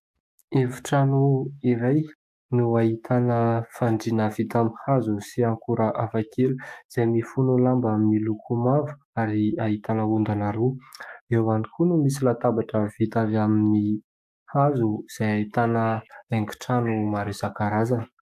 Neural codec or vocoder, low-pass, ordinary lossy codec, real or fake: codec, 44.1 kHz, 7.8 kbps, DAC; 14.4 kHz; AAC, 96 kbps; fake